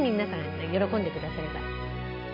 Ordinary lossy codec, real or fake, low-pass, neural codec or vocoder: none; real; 5.4 kHz; none